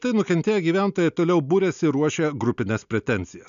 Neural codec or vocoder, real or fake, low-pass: none; real; 7.2 kHz